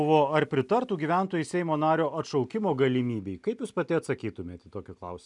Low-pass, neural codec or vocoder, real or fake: 10.8 kHz; none; real